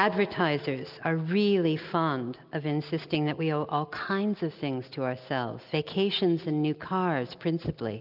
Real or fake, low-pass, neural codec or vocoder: real; 5.4 kHz; none